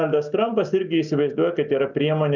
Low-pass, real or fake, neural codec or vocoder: 7.2 kHz; real; none